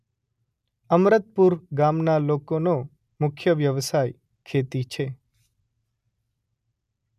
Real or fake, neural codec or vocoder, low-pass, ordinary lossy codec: real; none; 14.4 kHz; none